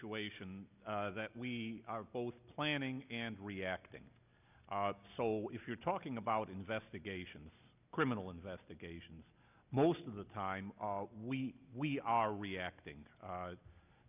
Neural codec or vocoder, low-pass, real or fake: none; 3.6 kHz; real